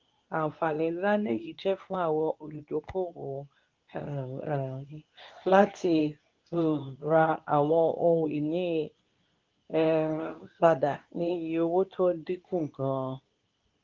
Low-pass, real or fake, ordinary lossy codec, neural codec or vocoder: 7.2 kHz; fake; Opus, 32 kbps; codec, 24 kHz, 0.9 kbps, WavTokenizer, medium speech release version 2